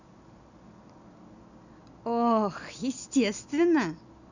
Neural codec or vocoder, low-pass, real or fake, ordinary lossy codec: none; 7.2 kHz; real; none